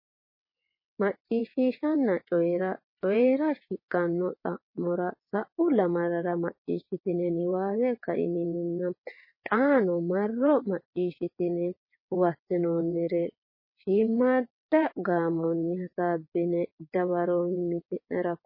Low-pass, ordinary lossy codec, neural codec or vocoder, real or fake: 5.4 kHz; MP3, 24 kbps; vocoder, 22.05 kHz, 80 mel bands, WaveNeXt; fake